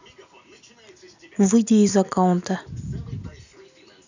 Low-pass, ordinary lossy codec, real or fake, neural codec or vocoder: 7.2 kHz; none; real; none